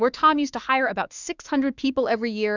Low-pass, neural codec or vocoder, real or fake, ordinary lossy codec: 7.2 kHz; autoencoder, 48 kHz, 32 numbers a frame, DAC-VAE, trained on Japanese speech; fake; Opus, 64 kbps